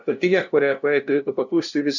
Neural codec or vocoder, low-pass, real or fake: codec, 16 kHz, 0.5 kbps, FunCodec, trained on LibriTTS, 25 frames a second; 7.2 kHz; fake